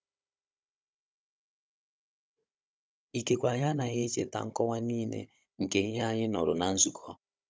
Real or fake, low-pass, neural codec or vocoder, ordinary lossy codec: fake; none; codec, 16 kHz, 16 kbps, FunCodec, trained on Chinese and English, 50 frames a second; none